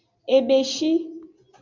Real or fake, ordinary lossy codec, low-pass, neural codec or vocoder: real; MP3, 64 kbps; 7.2 kHz; none